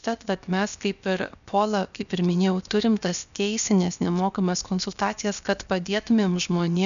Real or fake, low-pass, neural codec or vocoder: fake; 7.2 kHz; codec, 16 kHz, about 1 kbps, DyCAST, with the encoder's durations